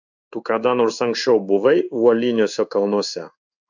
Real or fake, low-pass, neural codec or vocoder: fake; 7.2 kHz; codec, 16 kHz in and 24 kHz out, 1 kbps, XY-Tokenizer